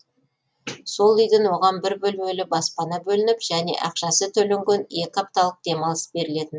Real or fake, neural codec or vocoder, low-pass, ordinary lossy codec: real; none; none; none